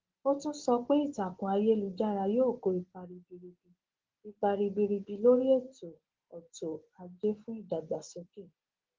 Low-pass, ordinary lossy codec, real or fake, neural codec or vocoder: 7.2 kHz; Opus, 16 kbps; real; none